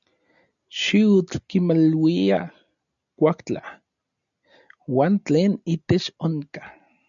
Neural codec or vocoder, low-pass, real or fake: none; 7.2 kHz; real